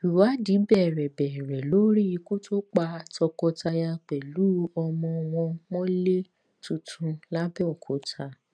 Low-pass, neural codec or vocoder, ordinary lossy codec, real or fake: 9.9 kHz; none; none; real